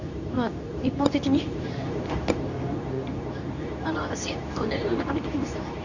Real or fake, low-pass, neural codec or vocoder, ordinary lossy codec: fake; 7.2 kHz; codec, 24 kHz, 0.9 kbps, WavTokenizer, medium speech release version 2; none